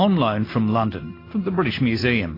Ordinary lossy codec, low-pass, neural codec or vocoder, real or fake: AAC, 24 kbps; 5.4 kHz; none; real